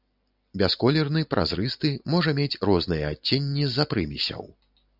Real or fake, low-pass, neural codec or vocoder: real; 5.4 kHz; none